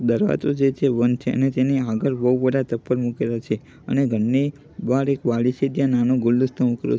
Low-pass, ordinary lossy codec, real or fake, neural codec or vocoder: none; none; real; none